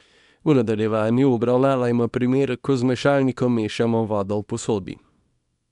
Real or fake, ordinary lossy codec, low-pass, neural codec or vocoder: fake; none; 10.8 kHz; codec, 24 kHz, 0.9 kbps, WavTokenizer, small release